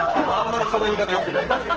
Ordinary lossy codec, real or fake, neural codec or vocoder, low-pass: Opus, 16 kbps; fake; codec, 44.1 kHz, 2.6 kbps, SNAC; 7.2 kHz